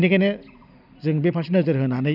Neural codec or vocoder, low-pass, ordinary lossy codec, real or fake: none; 5.4 kHz; none; real